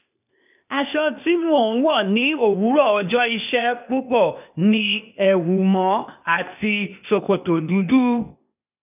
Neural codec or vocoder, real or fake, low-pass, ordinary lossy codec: codec, 16 kHz, 0.8 kbps, ZipCodec; fake; 3.6 kHz; none